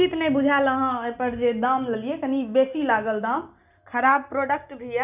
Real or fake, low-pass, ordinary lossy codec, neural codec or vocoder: real; 3.6 kHz; none; none